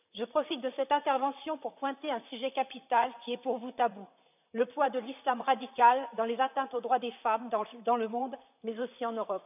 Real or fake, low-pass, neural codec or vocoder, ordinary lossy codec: fake; 3.6 kHz; vocoder, 44.1 kHz, 128 mel bands, Pupu-Vocoder; none